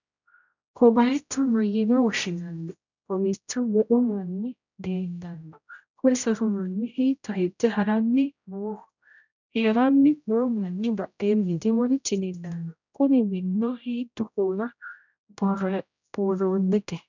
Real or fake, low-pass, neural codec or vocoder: fake; 7.2 kHz; codec, 16 kHz, 0.5 kbps, X-Codec, HuBERT features, trained on general audio